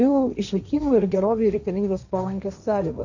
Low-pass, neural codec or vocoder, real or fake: 7.2 kHz; codec, 16 kHz, 1.1 kbps, Voila-Tokenizer; fake